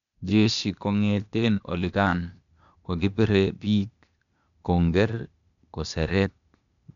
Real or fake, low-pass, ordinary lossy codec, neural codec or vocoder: fake; 7.2 kHz; none; codec, 16 kHz, 0.8 kbps, ZipCodec